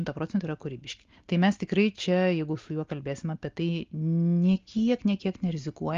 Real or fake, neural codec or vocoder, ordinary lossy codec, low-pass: real; none; Opus, 32 kbps; 7.2 kHz